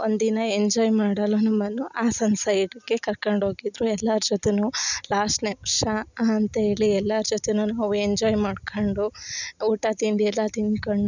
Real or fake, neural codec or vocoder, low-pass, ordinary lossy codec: real; none; 7.2 kHz; none